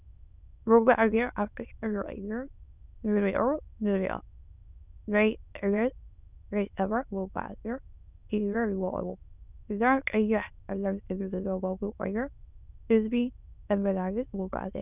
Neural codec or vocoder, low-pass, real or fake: autoencoder, 22.05 kHz, a latent of 192 numbers a frame, VITS, trained on many speakers; 3.6 kHz; fake